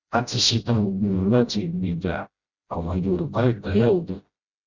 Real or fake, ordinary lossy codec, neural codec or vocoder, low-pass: fake; Opus, 64 kbps; codec, 16 kHz, 0.5 kbps, FreqCodec, smaller model; 7.2 kHz